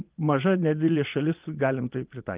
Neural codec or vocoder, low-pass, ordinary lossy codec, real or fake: vocoder, 22.05 kHz, 80 mel bands, Vocos; 3.6 kHz; Opus, 24 kbps; fake